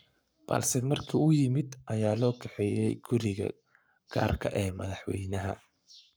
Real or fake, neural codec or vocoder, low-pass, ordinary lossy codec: fake; codec, 44.1 kHz, 7.8 kbps, Pupu-Codec; none; none